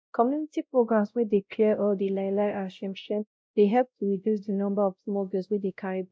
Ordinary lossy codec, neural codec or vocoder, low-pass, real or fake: none; codec, 16 kHz, 0.5 kbps, X-Codec, WavLM features, trained on Multilingual LibriSpeech; none; fake